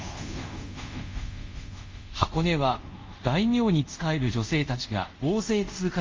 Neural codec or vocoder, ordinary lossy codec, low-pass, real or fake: codec, 24 kHz, 0.5 kbps, DualCodec; Opus, 32 kbps; 7.2 kHz; fake